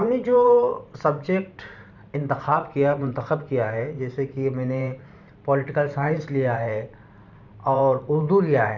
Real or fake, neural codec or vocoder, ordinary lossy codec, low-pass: fake; vocoder, 44.1 kHz, 128 mel bands every 512 samples, BigVGAN v2; none; 7.2 kHz